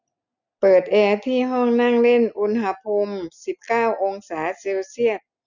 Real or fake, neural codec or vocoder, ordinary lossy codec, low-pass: real; none; none; 7.2 kHz